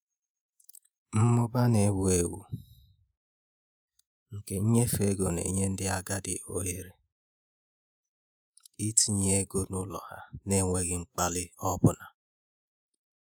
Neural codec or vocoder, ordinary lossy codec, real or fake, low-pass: vocoder, 48 kHz, 128 mel bands, Vocos; none; fake; none